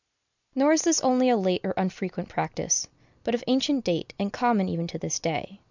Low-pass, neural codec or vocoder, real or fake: 7.2 kHz; none; real